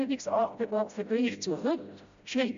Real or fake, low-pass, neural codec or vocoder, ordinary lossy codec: fake; 7.2 kHz; codec, 16 kHz, 0.5 kbps, FreqCodec, smaller model; MP3, 64 kbps